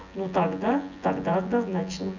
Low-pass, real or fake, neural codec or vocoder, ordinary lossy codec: 7.2 kHz; fake; vocoder, 24 kHz, 100 mel bands, Vocos; none